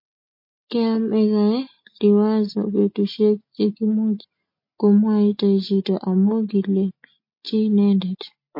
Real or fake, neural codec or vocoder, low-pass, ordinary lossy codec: real; none; 5.4 kHz; MP3, 32 kbps